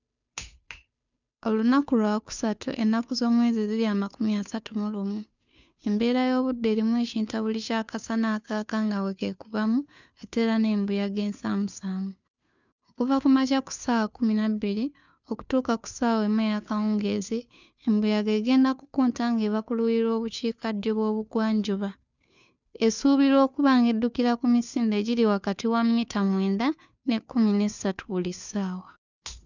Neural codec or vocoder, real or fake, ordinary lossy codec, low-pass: codec, 16 kHz, 2 kbps, FunCodec, trained on Chinese and English, 25 frames a second; fake; none; 7.2 kHz